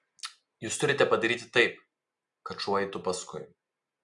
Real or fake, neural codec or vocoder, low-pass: real; none; 10.8 kHz